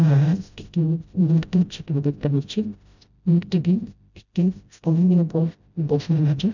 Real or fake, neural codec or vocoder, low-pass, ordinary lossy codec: fake; codec, 16 kHz, 0.5 kbps, FreqCodec, smaller model; 7.2 kHz; none